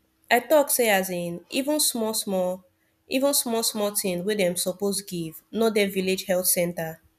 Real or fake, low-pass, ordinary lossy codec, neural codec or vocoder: real; 14.4 kHz; none; none